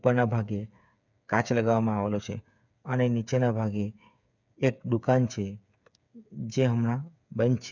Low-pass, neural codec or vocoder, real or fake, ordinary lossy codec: 7.2 kHz; codec, 16 kHz, 8 kbps, FreqCodec, smaller model; fake; none